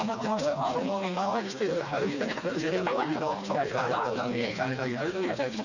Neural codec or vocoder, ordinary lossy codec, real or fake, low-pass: codec, 16 kHz, 2 kbps, FreqCodec, smaller model; none; fake; 7.2 kHz